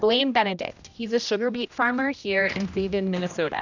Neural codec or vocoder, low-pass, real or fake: codec, 16 kHz, 1 kbps, X-Codec, HuBERT features, trained on general audio; 7.2 kHz; fake